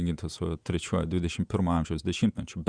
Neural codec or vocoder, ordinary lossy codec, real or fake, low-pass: vocoder, 48 kHz, 128 mel bands, Vocos; Opus, 64 kbps; fake; 9.9 kHz